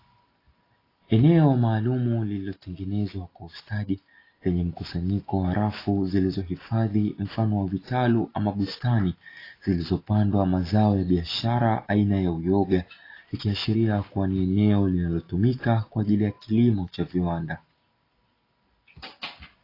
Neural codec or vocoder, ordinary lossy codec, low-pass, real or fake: none; AAC, 24 kbps; 5.4 kHz; real